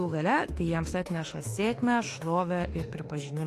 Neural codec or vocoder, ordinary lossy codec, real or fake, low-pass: autoencoder, 48 kHz, 32 numbers a frame, DAC-VAE, trained on Japanese speech; AAC, 48 kbps; fake; 14.4 kHz